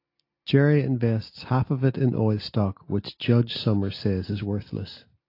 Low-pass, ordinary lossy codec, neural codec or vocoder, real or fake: 5.4 kHz; AAC, 32 kbps; none; real